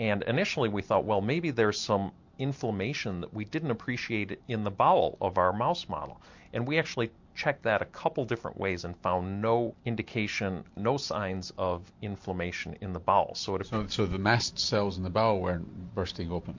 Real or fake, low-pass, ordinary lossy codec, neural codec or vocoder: real; 7.2 kHz; MP3, 48 kbps; none